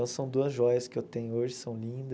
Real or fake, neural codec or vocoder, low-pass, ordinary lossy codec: real; none; none; none